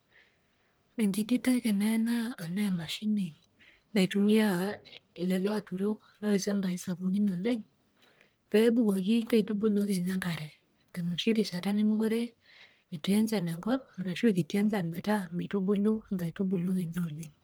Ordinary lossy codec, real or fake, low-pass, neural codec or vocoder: none; fake; none; codec, 44.1 kHz, 1.7 kbps, Pupu-Codec